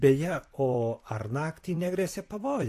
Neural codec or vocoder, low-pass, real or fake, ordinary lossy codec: vocoder, 44.1 kHz, 128 mel bands, Pupu-Vocoder; 14.4 kHz; fake; AAC, 64 kbps